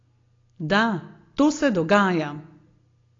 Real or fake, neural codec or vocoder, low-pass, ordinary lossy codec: real; none; 7.2 kHz; AAC, 32 kbps